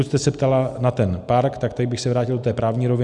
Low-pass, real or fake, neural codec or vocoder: 10.8 kHz; real; none